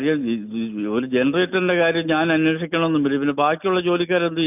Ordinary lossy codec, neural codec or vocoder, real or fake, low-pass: none; none; real; 3.6 kHz